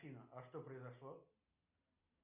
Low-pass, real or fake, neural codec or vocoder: 3.6 kHz; real; none